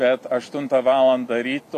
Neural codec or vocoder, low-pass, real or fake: none; 14.4 kHz; real